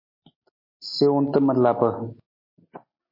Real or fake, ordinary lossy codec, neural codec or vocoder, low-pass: real; MP3, 24 kbps; none; 5.4 kHz